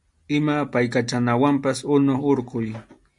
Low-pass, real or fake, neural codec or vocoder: 10.8 kHz; real; none